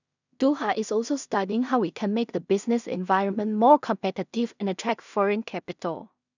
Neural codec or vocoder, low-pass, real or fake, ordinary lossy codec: codec, 16 kHz in and 24 kHz out, 0.4 kbps, LongCat-Audio-Codec, two codebook decoder; 7.2 kHz; fake; none